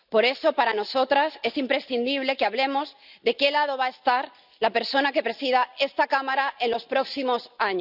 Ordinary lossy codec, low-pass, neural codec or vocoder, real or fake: none; 5.4 kHz; none; real